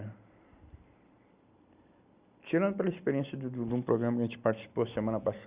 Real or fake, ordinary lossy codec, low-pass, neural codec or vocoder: real; Opus, 64 kbps; 3.6 kHz; none